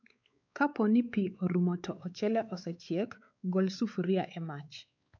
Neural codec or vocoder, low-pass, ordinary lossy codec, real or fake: codec, 16 kHz, 4 kbps, X-Codec, WavLM features, trained on Multilingual LibriSpeech; 7.2 kHz; none; fake